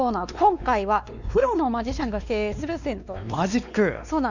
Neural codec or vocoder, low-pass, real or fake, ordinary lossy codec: codec, 16 kHz, 2 kbps, X-Codec, WavLM features, trained on Multilingual LibriSpeech; 7.2 kHz; fake; none